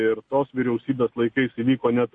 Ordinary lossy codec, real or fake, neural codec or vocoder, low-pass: MP3, 48 kbps; real; none; 9.9 kHz